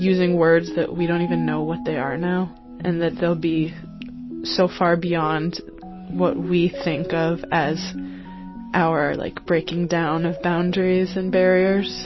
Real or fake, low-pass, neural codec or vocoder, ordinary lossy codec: real; 7.2 kHz; none; MP3, 24 kbps